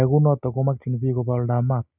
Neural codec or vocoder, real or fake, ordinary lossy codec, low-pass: none; real; none; 3.6 kHz